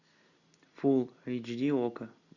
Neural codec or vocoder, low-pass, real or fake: none; 7.2 kHz; real